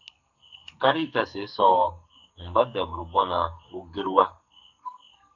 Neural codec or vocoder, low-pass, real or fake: codec, 44.1 kHz, 2.6 kbps, SNAC; 7.2 kHz; fake